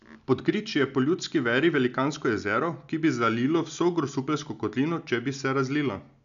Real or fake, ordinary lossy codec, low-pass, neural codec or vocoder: real; none; 7.2 kHz; none